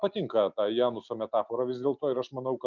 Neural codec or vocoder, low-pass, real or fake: none; 7.2 kHz; real